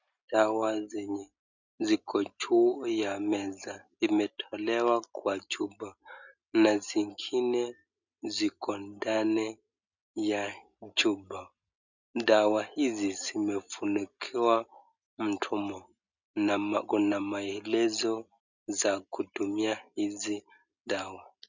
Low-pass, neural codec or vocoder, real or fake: 7.2 kHz; none; real